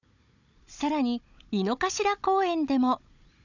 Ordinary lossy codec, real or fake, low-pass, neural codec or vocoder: none; fake; 7.2 kHz; codec, 16 kHz, 16 kbps, FunCodec, trained on Chinese and English, 50 frames a second